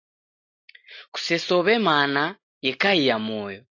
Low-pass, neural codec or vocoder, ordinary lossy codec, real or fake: 7.2 kHz; none; AAC, 48 kbps; real